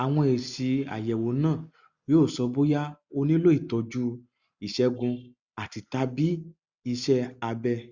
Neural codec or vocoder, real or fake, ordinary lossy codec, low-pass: none; real; Opus, 64 kbps; 7.2 kHz